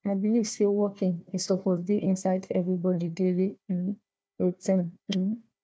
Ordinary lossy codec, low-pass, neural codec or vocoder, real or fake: none; none; codec, 16 kHz, 1 kbps, FunCodec, trained on Chinese and English, 50 frames a second; fake